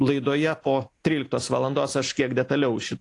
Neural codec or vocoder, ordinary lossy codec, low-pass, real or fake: none; AAC, 48 kbps; 10.8 kHz; real